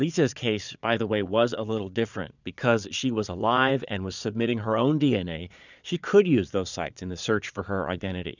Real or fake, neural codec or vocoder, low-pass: fake; vocoder, 22.05 kHz, 80 mel bands, Vocos; 7.2 kHz